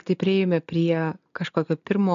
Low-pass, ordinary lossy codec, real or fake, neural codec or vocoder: 7.2 kHz; MP3, 96 kbps; real; none